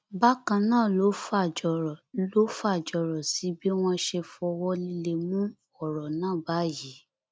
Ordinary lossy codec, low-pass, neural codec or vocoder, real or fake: none; none; none; real